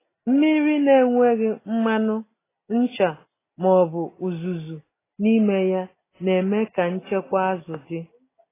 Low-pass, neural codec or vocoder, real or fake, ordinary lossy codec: 3.6 kHz; none; real; AAC, 16 kbps